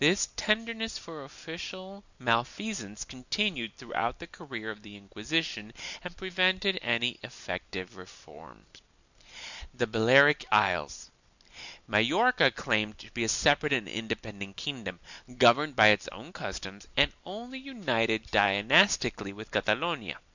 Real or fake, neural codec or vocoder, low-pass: real; none; 7.2 kHz